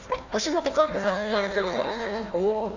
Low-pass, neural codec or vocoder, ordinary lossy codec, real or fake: 7.2 kHz; codec, 16 kHz, 1 kbps, FunCodec, trained on Chinese and English, 50 frames a second; none; fake